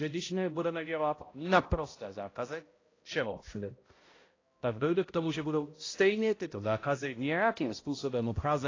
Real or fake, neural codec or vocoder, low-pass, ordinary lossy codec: fake; codec, 16 kHz, 0.5 kbps, X-Codec, HuBERT features, trained on balanced general audio; 7.2 kHz; AAC, 32 kbps